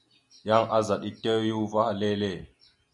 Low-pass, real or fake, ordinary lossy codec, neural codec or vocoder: 10.8 kHz; real; MP3, 96 kbps; none